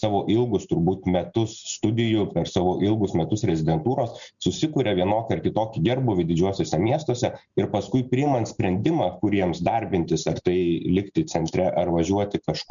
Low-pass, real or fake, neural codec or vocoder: 7.2 kHz; real; none